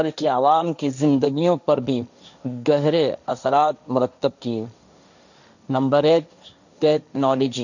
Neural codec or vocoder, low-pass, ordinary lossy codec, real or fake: codec, 16 kHz, 1.1 kbps, Voila-Tokenizer; 7.2 kHz; none; fake